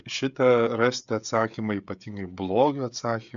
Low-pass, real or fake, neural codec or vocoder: 7.2 kHz; fake; codec, 16 kHz, 16 kbps, FreqCodec, smaller model